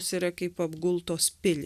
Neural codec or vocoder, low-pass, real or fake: none; 14.4 kHz; real